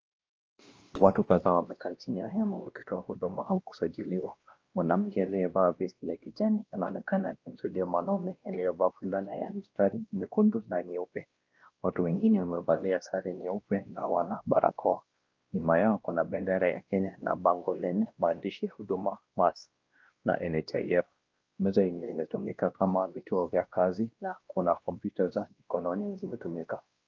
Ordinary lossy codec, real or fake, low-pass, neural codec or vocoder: Opus, 24 kbps; fake; 7.2 kHz; codec, 16 kHz, 1 kbps, X-Codec, WavLM features, trained on Multilingual LibriSpeech